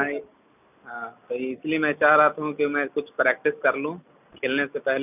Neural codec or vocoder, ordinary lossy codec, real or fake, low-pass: none; none; real; 3.6 kHz